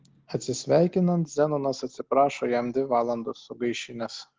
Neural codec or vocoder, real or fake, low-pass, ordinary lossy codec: none; real; 7.2 kHz; Opus, 16 kbps